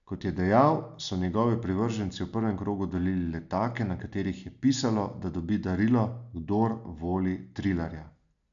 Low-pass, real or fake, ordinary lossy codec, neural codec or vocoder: 7.2 kHz; real; none; none